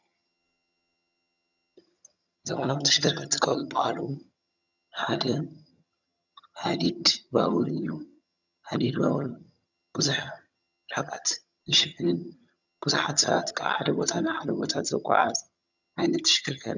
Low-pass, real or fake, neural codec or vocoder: 7.2 kHz; fake; vocoder, 22.05 kHz, 80 mel bands, HiFi-GAN